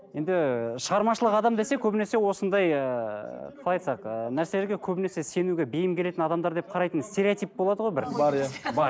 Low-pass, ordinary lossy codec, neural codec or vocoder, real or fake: none; none; none; real